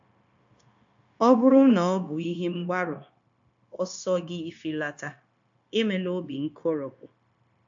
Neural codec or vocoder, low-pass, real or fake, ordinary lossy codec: codec, 16 kHz, 0.9 kbps, LongCat-Audio-Codec; 7.2 kHz; fake; AAC, 64 kbps